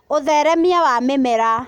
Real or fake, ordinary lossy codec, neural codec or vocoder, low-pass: real; none; none; 19.8 kHz